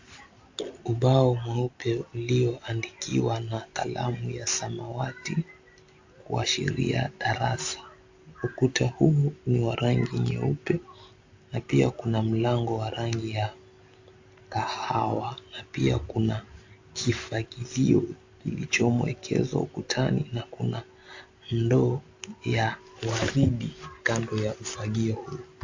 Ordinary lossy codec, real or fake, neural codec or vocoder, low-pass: AAC, 48 kbps; real; none; 7.2 kHz